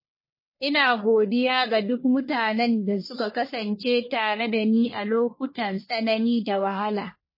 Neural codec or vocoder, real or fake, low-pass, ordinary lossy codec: codec, 44.1 kHz, 1.7 kbps, Pupu-Codec; fake; 5.4 kHz; MP3, 24 kbps